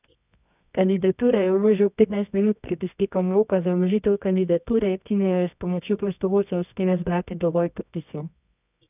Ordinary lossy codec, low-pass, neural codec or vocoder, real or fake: none; 3.6 kHz; codec, 24 kHz, 0.9 kbps, WavTokenizer, medium music audio release; fake